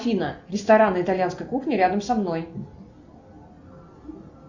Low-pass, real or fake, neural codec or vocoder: 7.2 kHz; real; none